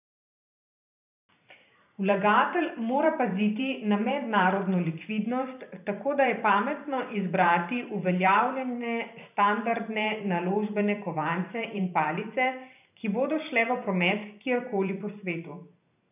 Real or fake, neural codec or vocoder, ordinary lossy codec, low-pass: fake; vocoder, 44.1 kHz, 128 mel bands every 512 samples, BigVGAN v2; none; 3.6 kHz